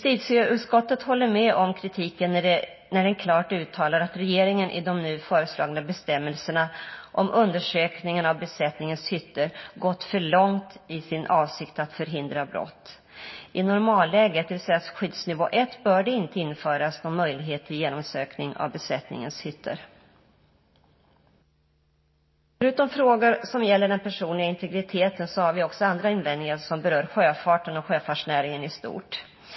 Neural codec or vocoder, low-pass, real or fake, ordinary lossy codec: none; 7.2 kHz; real; MP3, 24 kbps